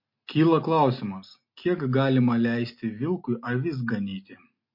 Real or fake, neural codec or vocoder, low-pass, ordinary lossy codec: real; none; 5.4 kHz; MP3, 32 kbps